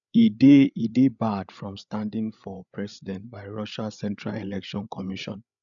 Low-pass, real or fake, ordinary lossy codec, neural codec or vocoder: 7.2 kHz; fake; none; codec, 16 kHz, 8 kbps, FreqCodec, larger model